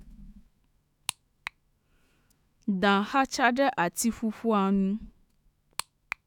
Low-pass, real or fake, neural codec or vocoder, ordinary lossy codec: 19.8 kHz; fake; autoencoder, 48 kHz, 128 numbers a frame, DAC-VAE, trained on Japanese speech; none